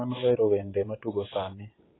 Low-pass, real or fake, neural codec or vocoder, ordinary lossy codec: 7.2 kHz; fake; vocoder, 44.1 kHz, 128 mel bands every 512 samples, BigVGAN v2; AAC, 16 kbps